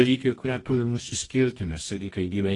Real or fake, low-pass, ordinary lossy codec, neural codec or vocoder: fake; 10.8 kHz; AAC, 32 kbps; codec, 24 kHz, 0.9 kbps, WavTokenizer, medium music audio release